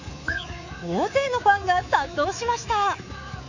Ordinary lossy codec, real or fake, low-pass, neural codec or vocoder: none; fake; 7.2 kHz; codec, 24 kHz, 3.1 kbps, DualCodec